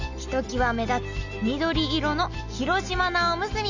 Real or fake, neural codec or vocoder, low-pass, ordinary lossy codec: real; none; 7.2 kHz; none